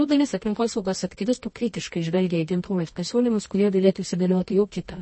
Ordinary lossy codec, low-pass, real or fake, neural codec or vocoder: MP3, 32 kbps; 9.9 kHz; fake; codec, 24 kHz, 0.9 kbps, WavTokenizer, medium music audio release